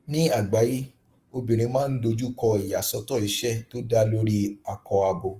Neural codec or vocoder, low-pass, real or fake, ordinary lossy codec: codec, 44.1 kHz, 7.8 kbps, Pupu-Codec; 14.4 kHz; fake; Opus, 32 kbps